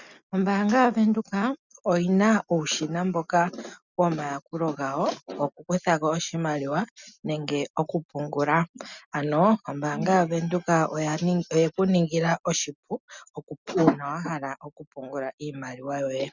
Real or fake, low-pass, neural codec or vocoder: real; 7.2 kHz; none